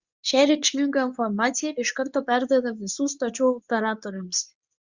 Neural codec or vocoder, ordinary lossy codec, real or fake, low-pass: codec, 24 kHz, 0.9 kbps, WavTokenizer, medium speech release version 2; Opus, 64 kbps; fake; 7.2 kHz